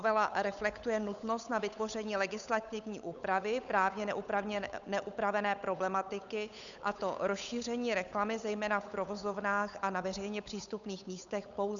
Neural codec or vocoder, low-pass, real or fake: codec, 16 kHz, 8 kbps, FunCodec, trained on Chinese and English, 25 frames a second; 7.2 kHz; fake